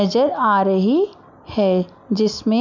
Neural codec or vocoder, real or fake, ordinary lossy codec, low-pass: none; real; none; 7.2 kHz